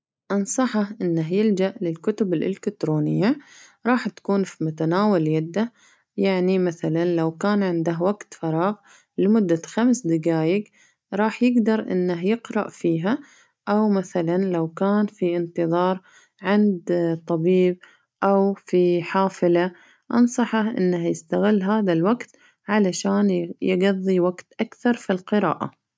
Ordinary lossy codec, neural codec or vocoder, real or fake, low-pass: none; none; real; none